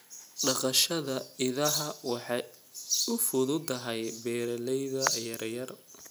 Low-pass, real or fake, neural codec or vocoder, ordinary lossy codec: none; real; none; none